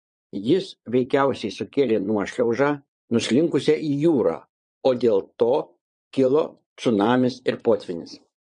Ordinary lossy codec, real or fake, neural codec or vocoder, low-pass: MP3, 48 kbps; fake; vocoder, 22.05 kHz, 80 mel bands, Vocos; 9.9 kHz